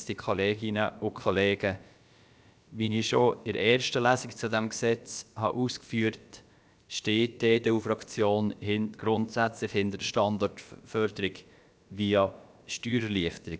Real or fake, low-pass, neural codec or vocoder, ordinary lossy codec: fake; none; codec, 16 kHz, about 1 kbps, DyCAST, with the encoder's durations; none